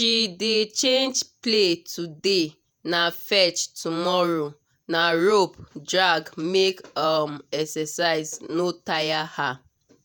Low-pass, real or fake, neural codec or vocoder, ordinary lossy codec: none; fake; vocoder, 48 kHz, 128 mel bands, Vocos; none